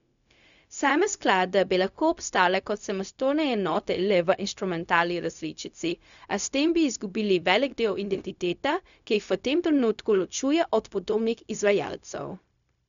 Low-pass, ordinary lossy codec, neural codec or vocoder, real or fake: 7.2 kHz; none; codec, 16 kHz, 0.4 kbps, LongCat-Audio-Codec; fake